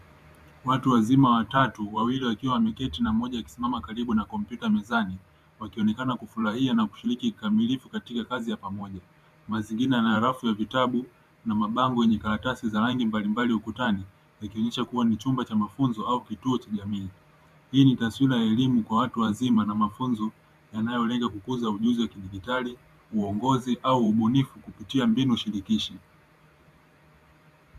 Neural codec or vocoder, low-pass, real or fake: vocoder, 44.1 kHz, 128 mel bands every 512 samples, BigVGAN v2; 14.4 kHz; fake